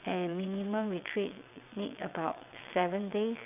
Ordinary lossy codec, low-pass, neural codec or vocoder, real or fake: none; 3.6 kHz; vocoder, 22.05 kHz, 80 mel bands, WaveNeXt; fake